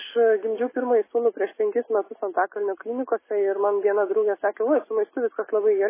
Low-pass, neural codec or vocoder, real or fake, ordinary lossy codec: 3.6 kHz; none; real; MP3, 16 kbps